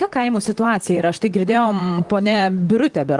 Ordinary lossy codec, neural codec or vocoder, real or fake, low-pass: Opus, 24 kbps; vocoder, 44.1 kHz, 128 mel bands, Pupu-Vocoder; fake; 10.8 kHz